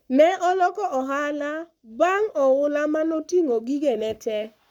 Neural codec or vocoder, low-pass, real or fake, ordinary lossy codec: codec, 44.1 kHz, 7.8 kbps, Pupu-Codec; 19.8 kHz; fake; none